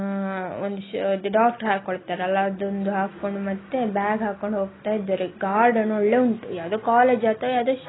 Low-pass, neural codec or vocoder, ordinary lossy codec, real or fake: 7.2 kHz; none; AAC, 16 kbps; real